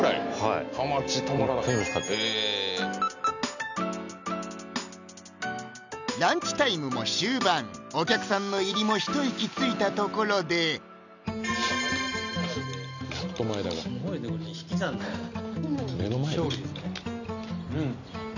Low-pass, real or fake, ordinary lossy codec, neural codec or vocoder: 7.2 kHz; real; none; none